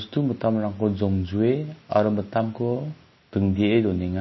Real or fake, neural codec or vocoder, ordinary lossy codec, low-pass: real; none; MP3, 24 kbps; 7.2 kHz